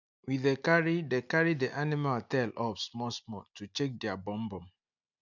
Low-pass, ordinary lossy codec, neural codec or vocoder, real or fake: 7.2 kHz; none; none; real